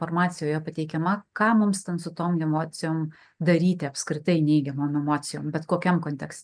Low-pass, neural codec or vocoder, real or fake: 9.9 kHz; none; real